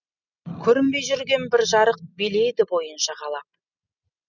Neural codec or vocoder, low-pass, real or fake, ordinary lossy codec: none; 7.2 kHz; real; none